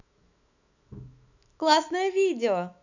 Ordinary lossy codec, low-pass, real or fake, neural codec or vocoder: none; 7.2 kHz; fake; autoencoder, 48 kHz, 128 numbers a frame, DAC-VAE, trained on Japanese speech